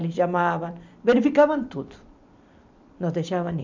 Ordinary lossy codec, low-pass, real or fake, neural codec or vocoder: MP3, 64 kbps; 7.2 kHz; fake; vocoder, 44.1 kHz, 128 mel bands every 256 samples, BigVGAN v2